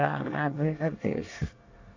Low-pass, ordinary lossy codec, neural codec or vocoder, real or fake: 7.2 kHz; AAC, 48 kbps; codec, 24 kHz, 1 kbps, SNAC; fake